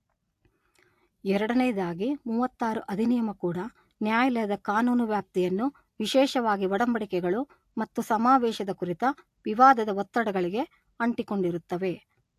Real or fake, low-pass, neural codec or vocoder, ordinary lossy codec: real; 14.4 kHz; none; AAC, 64 kbps